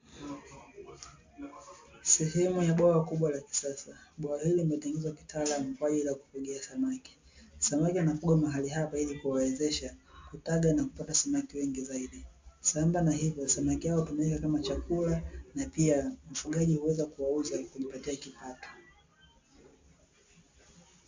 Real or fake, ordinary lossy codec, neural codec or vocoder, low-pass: real; MP3, 64 kbps; none; 7.2 kHz